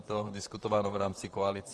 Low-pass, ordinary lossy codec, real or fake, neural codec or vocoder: 10.8 kHz; Opus, 24 kbps; fake; vocoder, 44.1 kHz, 128 mel bands, Pupu-Vocoder